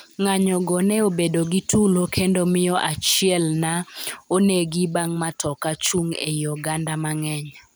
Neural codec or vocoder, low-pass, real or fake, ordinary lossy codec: none; none; real; none